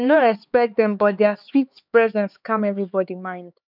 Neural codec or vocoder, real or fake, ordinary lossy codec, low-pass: codec, 16 kHz, 4 kbps, X-Codec, HuBERT features, trained on LibriSpeech; fake; none; 5.4 kHz